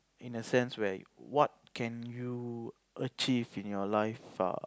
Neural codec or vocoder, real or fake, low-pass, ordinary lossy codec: none; real; none; none